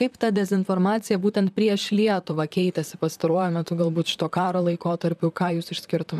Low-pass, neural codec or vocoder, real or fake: 14.4 kHz; vocoder, 44.1 kHz, 128 mel bands, Pupu-Vocoder; fake